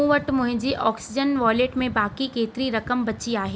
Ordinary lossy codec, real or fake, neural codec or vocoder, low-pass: none; real; none; none